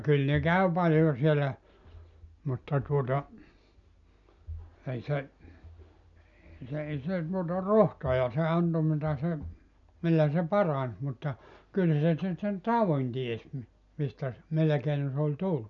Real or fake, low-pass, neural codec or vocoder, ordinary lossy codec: real; 7.2 kHz; none; none